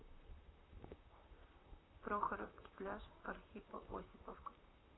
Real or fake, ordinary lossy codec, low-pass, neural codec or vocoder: fake; AAC, 16 kbps; 7.2 kHz; vocoder, 44.1 kHz, 128 mel bands, Pupu-Vocoder